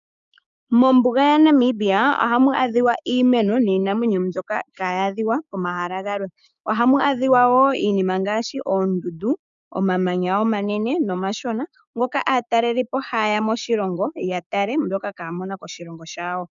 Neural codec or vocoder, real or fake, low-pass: codec, 16 kHz, 6 kbps, DAC; fake; 7.2 kHz